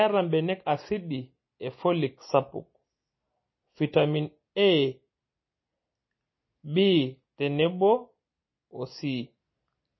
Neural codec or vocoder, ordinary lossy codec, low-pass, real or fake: none; MP3, 24 kbps; 7.2 kHz; real